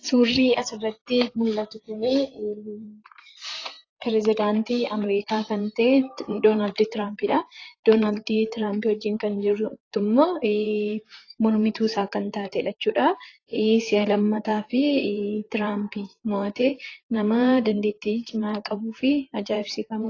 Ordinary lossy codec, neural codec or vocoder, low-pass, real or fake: AAC, 32 kbps; vocoder, 44.1 kHz, 128 mel bands, Pupu-Vocoder; 7.2 kHz; fake